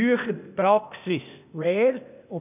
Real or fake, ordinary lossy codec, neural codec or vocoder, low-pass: fake; none; codec, 16 kHz, 0.8 kbps, ZipCodec; 3.6 kHz